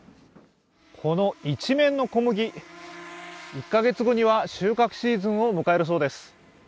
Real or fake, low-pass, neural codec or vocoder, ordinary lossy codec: real; none; none; none